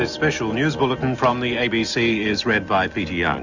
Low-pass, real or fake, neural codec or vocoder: 7.2 kHz; real; none